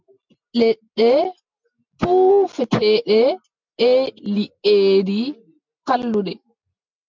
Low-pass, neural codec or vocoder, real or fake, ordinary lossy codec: 7.2 kHz; none; real; MP3, 64 kbps